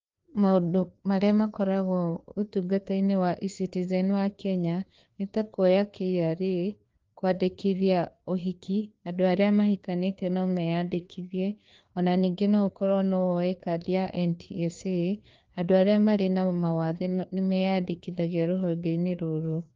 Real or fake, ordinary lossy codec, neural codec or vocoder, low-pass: fake; Opus, 32 kbps; codec, 16 kHz, 2 kbps, FreqCodec, larger model; 7.2 kHz